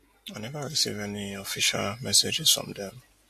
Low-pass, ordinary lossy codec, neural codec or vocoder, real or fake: 14.4 kHz; MP3, 64 kbps; none; real